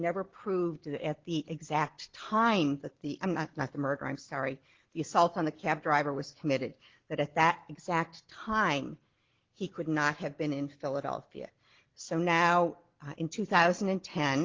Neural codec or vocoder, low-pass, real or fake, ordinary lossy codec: none; 7.2 kHz; real; Opus, 16 kbps